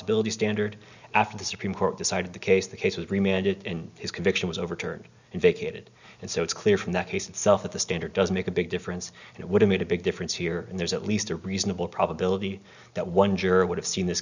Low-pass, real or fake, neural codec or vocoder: 7.2 kHz; real; none